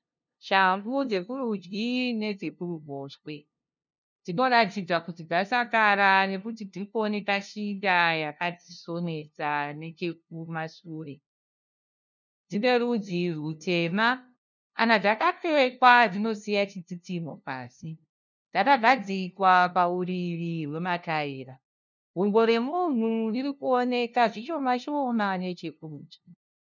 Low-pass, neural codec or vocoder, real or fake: 7.2 kHz; codec, 16 kHz, 0.5 kbps, FunCodec, trained on LibriTTS, 25 frames a second; fake